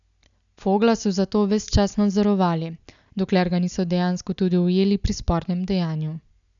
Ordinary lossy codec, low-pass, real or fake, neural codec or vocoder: none; 7.2 kHz; real; none